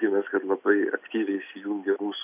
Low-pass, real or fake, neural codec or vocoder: 3.6 kHz; real; none